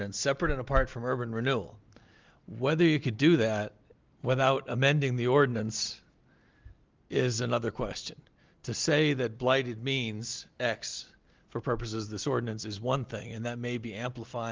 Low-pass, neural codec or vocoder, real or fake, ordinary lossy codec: 7.2 kHz; none; real; Opus, 32 kbps